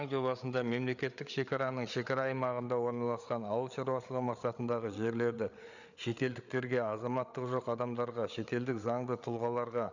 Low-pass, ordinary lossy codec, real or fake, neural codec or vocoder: 7.2 kHz; none; fake; codec, 16 kHz, 8 kbps, FreqCodec, larger model